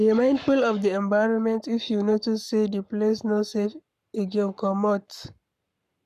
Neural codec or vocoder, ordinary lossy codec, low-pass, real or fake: codec, 44.1 kHz, 7.8 kbps, Pupu-Codec; none; 14.4 kHz; fake